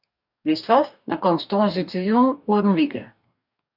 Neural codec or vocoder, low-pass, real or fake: codec, 44.1 kHz, 2.6 kbps, DAC; 5.4 kHz; fake